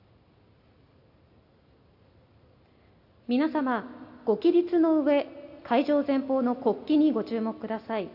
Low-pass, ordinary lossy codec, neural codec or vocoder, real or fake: 5.4 kHz; AAC, 48 kbps; none; real